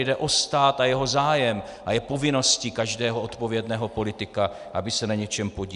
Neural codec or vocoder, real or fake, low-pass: none; real; 10.8 kHz